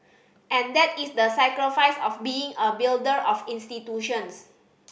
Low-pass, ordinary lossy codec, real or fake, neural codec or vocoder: none; none; real; none